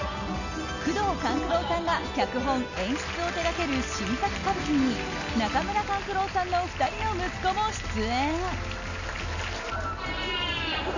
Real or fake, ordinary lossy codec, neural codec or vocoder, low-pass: real; none; none; 7.2 kHz